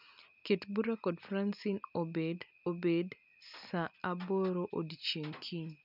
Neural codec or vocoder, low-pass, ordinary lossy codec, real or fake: none; 5.4 kHz; none; real